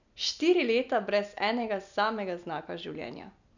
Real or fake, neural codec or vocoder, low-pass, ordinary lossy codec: real; none; 7.2 kHz; none